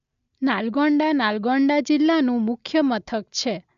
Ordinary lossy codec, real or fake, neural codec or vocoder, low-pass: AAC, 96 kbps; real; none; 7.2 kHz